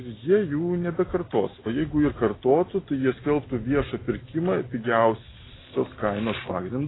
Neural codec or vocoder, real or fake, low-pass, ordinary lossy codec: none; real; 7.2 kHz; AAC, 16 kbps